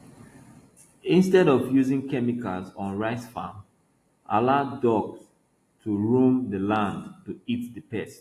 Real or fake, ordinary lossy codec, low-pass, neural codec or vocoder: real; AAC, 48 kbps; 14.4 kHz; none